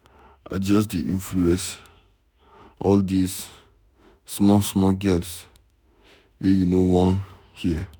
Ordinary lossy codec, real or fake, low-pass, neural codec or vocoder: none; fake; none; autoencoder, 48 kHz, 32 numbers a frame, DAC-VAE, trained on Japanese speech